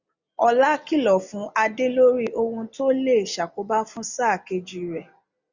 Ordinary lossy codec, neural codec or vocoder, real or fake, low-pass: Opus, 64 kbps; none; real; 7.2 kHz